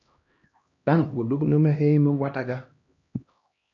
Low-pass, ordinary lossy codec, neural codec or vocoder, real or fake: 7.2 kHz; AAC, 48 kbps; codec, 16 kHz, 1 kbps, X-Codec, HuBERT features, trained on LibriSpeech; fake